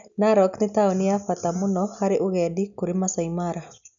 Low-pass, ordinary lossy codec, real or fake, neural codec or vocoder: 7.2 kHz; none; real; none